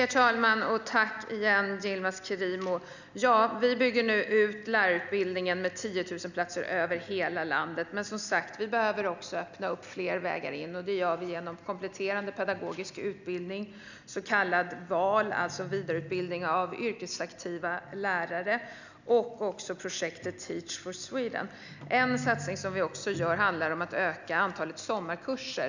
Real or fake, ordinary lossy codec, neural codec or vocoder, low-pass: real; none; none; 7.2 kHz